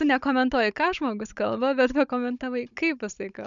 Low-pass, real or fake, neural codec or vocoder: 7.2 kHz; fake; codec, 16 kHz, 16 kbps, FunCodec, trained on Chinese and English, 50 frames a second